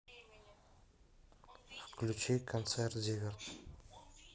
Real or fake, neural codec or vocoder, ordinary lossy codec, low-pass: real; none; none; none